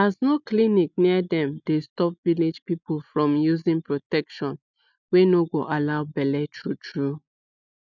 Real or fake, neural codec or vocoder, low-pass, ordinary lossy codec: real; none; 7.2 kHz; none